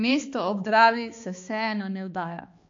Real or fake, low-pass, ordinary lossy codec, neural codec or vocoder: fake; 7.2 kHz; MP3, 48 kbps; codec, 16 kHz, 2 kbps, X-Codec, HuBERT features, trained on balanced general audio